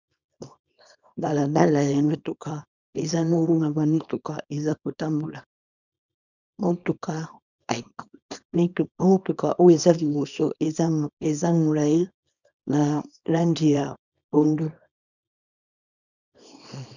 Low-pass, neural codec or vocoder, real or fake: 7.2 kHz; codec, 24 kHz, 0.9 kbps, WavTokenizer, small release; fake